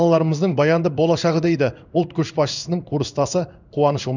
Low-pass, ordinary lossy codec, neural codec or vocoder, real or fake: 7.2 kHz; none; codec, 16 kHz in and 24 kHz out, 1 kbps, XY-Tokenizer; fake